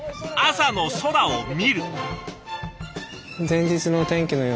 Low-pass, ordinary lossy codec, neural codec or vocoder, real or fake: none; none; none; real